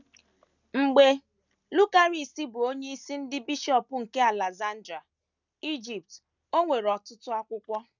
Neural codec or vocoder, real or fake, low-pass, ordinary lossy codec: none; real; 7.2 kHz; none